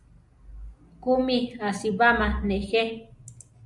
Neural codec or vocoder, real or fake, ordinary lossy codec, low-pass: none; real; MP3, 96 kbps; 10.8 kHz